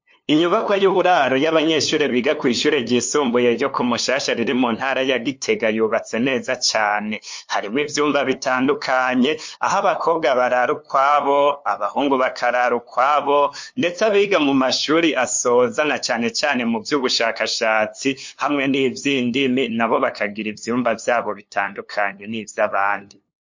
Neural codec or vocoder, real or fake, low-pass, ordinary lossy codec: codec, 16 kHz, 2 kbps, FunCodec, trained on LibriTTS, 25 frames a second; fake; 7.2 kHz; MP3, 48 kbps